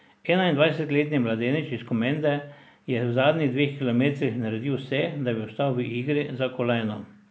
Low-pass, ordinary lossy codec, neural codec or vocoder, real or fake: none; none; none; real